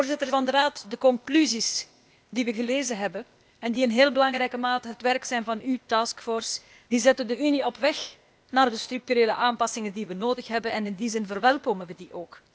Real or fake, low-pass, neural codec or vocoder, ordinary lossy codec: fake; none; codec, 16 kHz, 0.8 kbps, ZipCodec; none